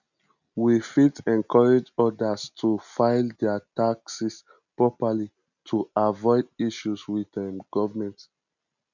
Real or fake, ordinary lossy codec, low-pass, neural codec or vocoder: real; none; 7.2 kHz; none